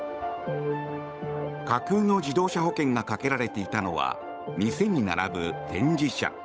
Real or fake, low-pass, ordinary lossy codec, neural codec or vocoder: fake; none; none; codec, 16 kHz, 8 kbps, FunCodec, trained on Chinese and English, 25 frames a second